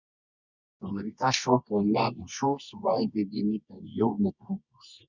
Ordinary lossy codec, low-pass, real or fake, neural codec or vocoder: Opus, 64 kbps; 7.2 kHz; fake; codec, 24 kHz, 0.9 kbps, WavTokenizer, medium music audio release